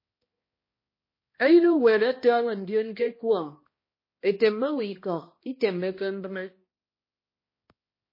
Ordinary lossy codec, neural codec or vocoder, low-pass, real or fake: MP3, 24 kbps; codec, 16 kHz, 1 kbps, X-Codec, HuBERT features, trained on balanced general audio; 5.4 kHz; fake